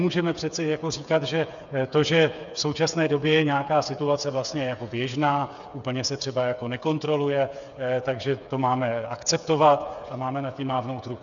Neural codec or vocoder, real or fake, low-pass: codec, 16 kHz, 8 kbps, FreqCodec, smaller model; fake; 7.2 kHz